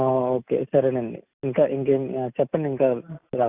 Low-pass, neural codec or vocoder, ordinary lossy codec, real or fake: 3.6 kHz; none; Opus, 64 kbps; real